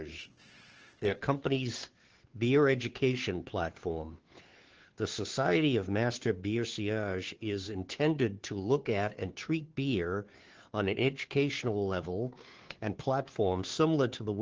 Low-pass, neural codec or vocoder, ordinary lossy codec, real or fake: 7.2 kHz; codec, 16 kHz, 2 kbps, FunCodec, trained on Chinese and English, 25 frames a second; Opus, 16 kbps; fake